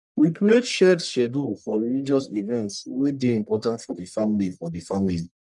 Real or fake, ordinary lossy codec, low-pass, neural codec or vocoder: fake; none; 10.8 kHz; codec, 44.1 kHz, 1.7 kbps, Pupu-Codec